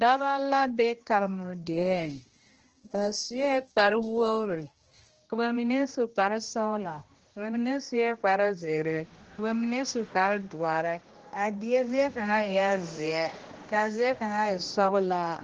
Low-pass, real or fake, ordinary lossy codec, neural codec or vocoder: 7.2 kHz; fake; Opus, 16 kbps; codec, 16 kHz, 1 kbps, X-Codec, HuBERT features, trained on general audio